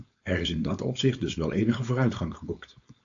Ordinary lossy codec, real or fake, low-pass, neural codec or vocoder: AAC, 64 kbps; fake; 7.2 kHz; codec, 16 kHz, 4.8 kbps, FACodec